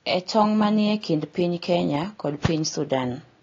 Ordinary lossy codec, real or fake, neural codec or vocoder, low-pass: AAC, 32 kbps; real; none; 7.2 kHz